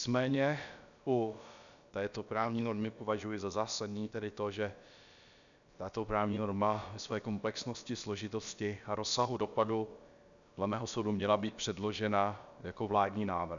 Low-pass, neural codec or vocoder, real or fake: 7.2 kHz; codec, 16 kHz, about 1 kbps, DyCAST, with the encoder's durations; fake